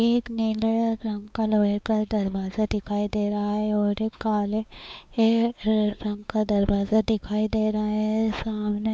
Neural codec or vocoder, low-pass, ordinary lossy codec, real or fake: codec, 16 kHz, 4 kbps, X-Codec, WavLM features, trained on Multilingual LibriSpeech; none; none; fake